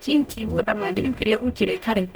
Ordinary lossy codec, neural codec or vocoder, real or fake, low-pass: none; codec, 44.1 kHz, 0.9 kbps, DAC; fake; none